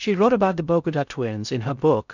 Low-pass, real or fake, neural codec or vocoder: 7.2 kHz; fake; codec, 16 kHz in and 24 kHz out, 0.6 kbps, FocalCodec, streaming, 2048 codes